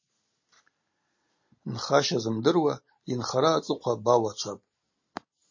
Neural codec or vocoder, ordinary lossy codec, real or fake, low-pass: none; MP3, 32 kbps; real; 7.2 kHz